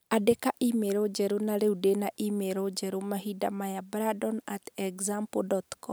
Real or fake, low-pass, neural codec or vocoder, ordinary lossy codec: real; none; none; none